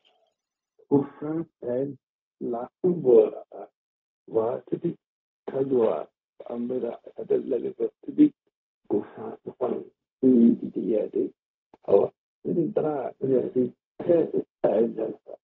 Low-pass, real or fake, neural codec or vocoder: 7.2 kHz; fake; codec, 16 kHz, 0.4 kbps, LongCat-Audio-Codec